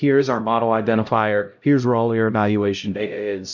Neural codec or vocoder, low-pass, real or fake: codec, 16 kHz, 0.5 kbps, X-Codec, HuBERT features, trained on LibriSpeech; 7.2 kHz; fake